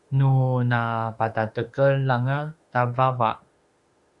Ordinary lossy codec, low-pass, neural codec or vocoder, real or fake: Opus, 64 kbps; 10.8 kHz; autoencoder, 48 kHz, 32 numbers a frame, DAC-VAE, trained on Japanese speech; fake